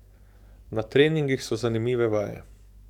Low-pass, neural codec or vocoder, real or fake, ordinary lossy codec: 19.8 kHz; codec, 44.1 kHz, 7.8 kbps, DAC; fake; none